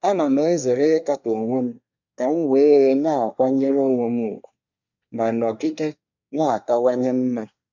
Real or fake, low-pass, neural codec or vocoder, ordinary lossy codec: fake; 7.2 kHz; codec, 24 kHz, 1 kbps, SNAC; none